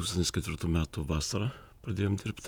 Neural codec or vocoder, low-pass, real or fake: vocoder, 48 kHz, 128 mel bands, Vocos; 19.8 kHz; fake